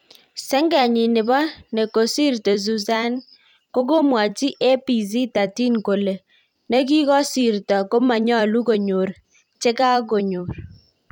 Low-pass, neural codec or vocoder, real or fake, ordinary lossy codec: 19.8 kHz; vocoder, 44.1 kHz, 128 mel bands every 256 samples, BigVGAN v2; fake; none